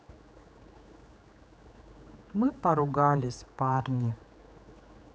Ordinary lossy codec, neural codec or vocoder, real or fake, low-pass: none; codec, 16 kHz, 4 kbps, X-Codec, HuBERT features, trained on general audio; fake; none